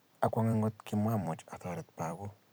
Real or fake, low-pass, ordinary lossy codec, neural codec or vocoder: fake; none; none; vocoder, 44.1 kHz, 128 mel bands every 256 samples, BigVGAN v2